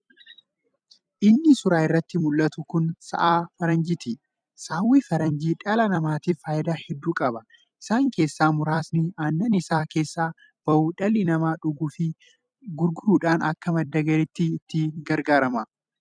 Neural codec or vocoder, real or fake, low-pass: vocoder, 44.1 kHz, 128 mel bands every 256 samples, BigVGAN v2; fake; 9.9 kHz